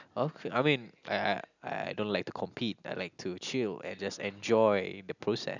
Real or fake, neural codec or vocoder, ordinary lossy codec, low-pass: real; none; none; 7.2 kHz